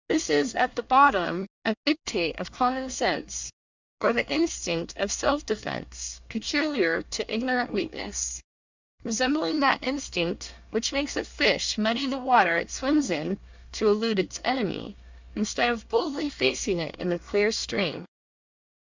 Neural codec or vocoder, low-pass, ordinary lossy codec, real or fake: codec, 24 kHz, 1 kbps, SNAC; 7.2 kHz; Opus, 64 kbps; fake